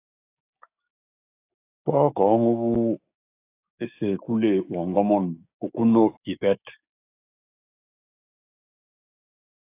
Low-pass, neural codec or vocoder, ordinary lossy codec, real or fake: 3.6 kHz; codec, 16 kHz, 6 kbps, DAC; AAC, 24 kbps; fake